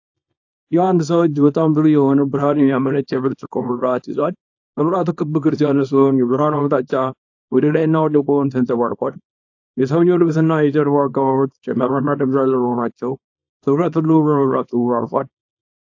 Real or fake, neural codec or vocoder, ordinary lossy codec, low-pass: fake; codec, 24 kHz, 0.9 kbps, WavTokenizer, small release; AAC, 48 kbps; 7.2 kHz